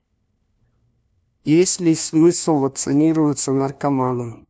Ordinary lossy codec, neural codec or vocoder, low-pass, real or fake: none; codec, 16 kHz, 1 kbps, FunCodec, trained on LibriTTS, 50 frames a second; none; fake